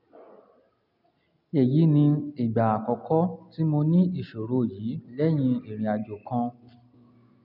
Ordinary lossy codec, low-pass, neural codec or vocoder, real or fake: none; 5.4 kHz; none; real